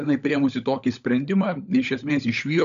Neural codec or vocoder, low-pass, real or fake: codec, 16 kHz, 16 kbps, FunCodec, trained on LibriTTS, 50 frames a second; 7.2 kHz; fake